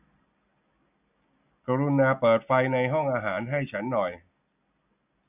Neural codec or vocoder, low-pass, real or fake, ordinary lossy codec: none; 3.6 kHz; real; none